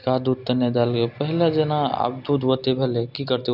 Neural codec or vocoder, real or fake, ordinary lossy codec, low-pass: none; real; none; 5.4 kHz